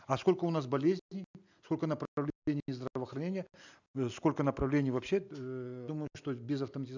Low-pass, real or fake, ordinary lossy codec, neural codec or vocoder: 7.2 kHz; real; none; none